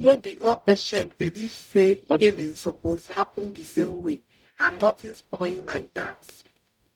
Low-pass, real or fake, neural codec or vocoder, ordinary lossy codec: 19.8 kHz; fake; codec, 44.1 kHz, 0.9 kbps, DAC; none